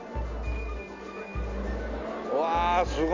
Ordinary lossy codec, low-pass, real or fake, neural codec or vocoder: MP3, 64 kbps; 7.2 kHz; real; none